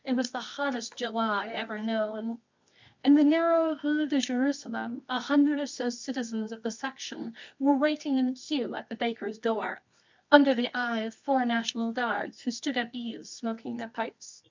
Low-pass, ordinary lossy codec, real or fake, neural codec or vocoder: 7.2 kHz; MP3, 64 kbps; fake; codec, 24 kHz, 0.9 kbps, WavTokenizer, medium music audio release